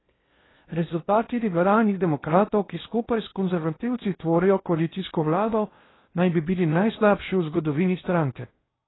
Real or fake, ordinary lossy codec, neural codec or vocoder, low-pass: fake; AAC, 16 kbps; codec, 16 kHz in and 24 kHz out, 0.6 kbps, FocalCodec, streaming, 4096 codes; 7.2 kHz